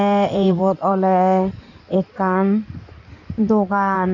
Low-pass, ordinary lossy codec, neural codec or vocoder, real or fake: 7.2 kHz; none; codec, 16 kHz in and 24 kHz out, 2.2 kbps, FireRedTTS-2 codec; fake